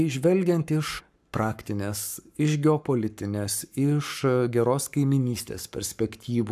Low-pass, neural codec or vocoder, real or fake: 14.4 kHz; codec, 44.1 kHz, 7.8 kbps, Pupu-Codec; fake